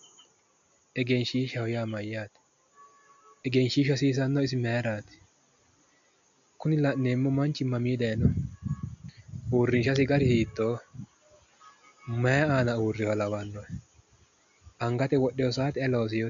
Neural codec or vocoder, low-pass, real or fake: none; 7.2 kHz; real